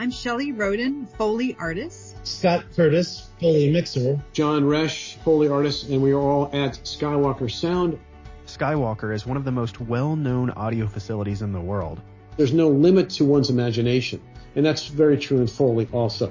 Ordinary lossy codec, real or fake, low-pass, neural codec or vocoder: MP3, 32 kbps; real; 7.2 kHz; none